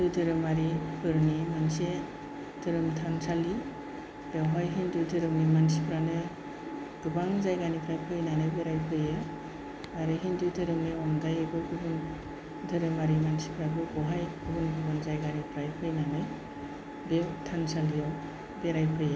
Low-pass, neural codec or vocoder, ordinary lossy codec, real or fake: none; none; none; real